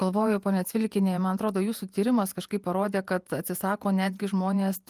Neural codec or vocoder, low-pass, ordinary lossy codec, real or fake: vocoder, 48 kHz, 128 mel bands, Vocos; 14.4 kHz; Opus, 32 kbps; fake